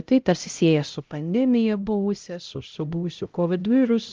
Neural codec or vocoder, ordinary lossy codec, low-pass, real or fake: codec, 16 kHz, 0.5 kbps, X-Codec, HuBERT features, trained on LibriSpeech; Opus, 24 kbps; 7.2 kHz; fake